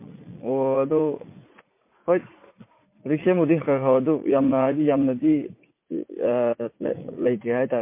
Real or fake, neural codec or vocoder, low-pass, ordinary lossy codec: fake; vocoder, 44.1 kHz, 80 mel bands, Vocos; 3.6 kHz; MP3, 24 kbps